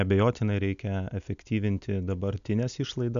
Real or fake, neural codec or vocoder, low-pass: real; none; 7.2 kHz